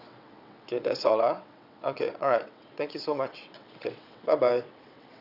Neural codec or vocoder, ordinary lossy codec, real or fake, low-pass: vocoder, 22.05 kHz, 80 mel bands, WaveNeXt; none; fake; 5.4 kHz